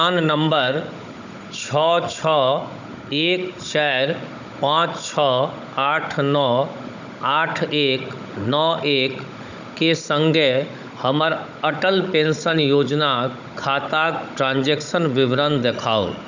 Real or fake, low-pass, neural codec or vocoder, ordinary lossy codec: fake; 7.2 kHz; codec, 16 kHz, 16 kbps, FunCodec, trained on Chinese and English, 50 frames a second; none